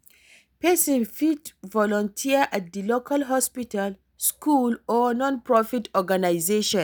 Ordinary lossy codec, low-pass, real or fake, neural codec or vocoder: none; none; real; none